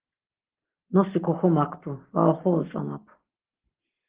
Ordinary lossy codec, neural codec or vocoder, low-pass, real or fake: Opus, 16 kbps; none; 3.6 kHz; real